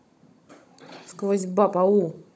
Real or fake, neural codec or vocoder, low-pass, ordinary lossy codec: fake; codec, 16 kHz, 16 kbps, FunCodec, trained on Chinese and English, 50 frames a second; none; none